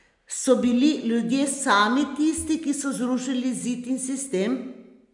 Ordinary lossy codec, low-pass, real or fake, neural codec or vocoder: none; 10.8 kHz; real; none